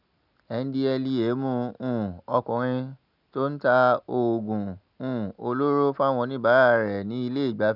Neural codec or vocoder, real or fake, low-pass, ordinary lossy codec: none; real; 5.4 kHz; none